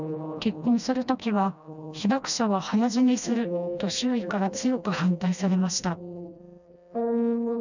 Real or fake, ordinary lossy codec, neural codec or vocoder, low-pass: fake; none; codec, 16 kHz, 1 kbps, FreqCodec, smaller model; 7.2 kHz